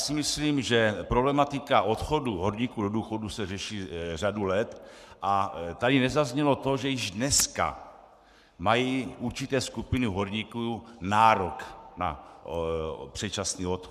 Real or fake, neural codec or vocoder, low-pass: fake; codec, 44.1 kHz, 7.8 kbps, Pupu-Codec; 14.4 kHz